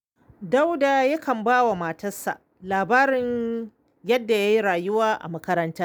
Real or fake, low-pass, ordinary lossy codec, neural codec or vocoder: real; none; none; none